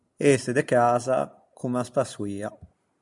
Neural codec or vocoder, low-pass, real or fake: none; 10.8 kHz; real